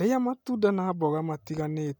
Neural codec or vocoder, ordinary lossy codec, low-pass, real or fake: none; none; none; real